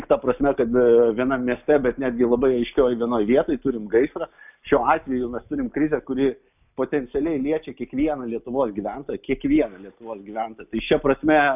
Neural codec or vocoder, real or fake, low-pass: none; real; 3.6 kHz